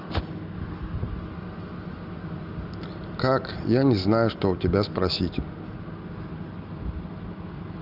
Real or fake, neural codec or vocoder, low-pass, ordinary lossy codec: real; none; 5.4 kHz; Opus, 24 kbps